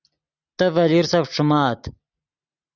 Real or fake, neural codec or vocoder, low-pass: real; none; 7.2 kHz